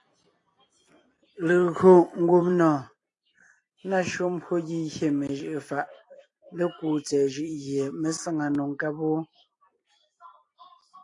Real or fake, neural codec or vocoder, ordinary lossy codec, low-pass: fake; vocoder, 44.1 kHz, 128 mel bands every 256 samples, BigVGAN v2; AAC, 48 kbps; 10.8 kHz